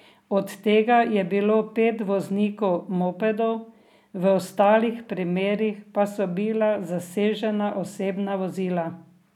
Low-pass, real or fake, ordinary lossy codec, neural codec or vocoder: 19.8 kHz; real; none; none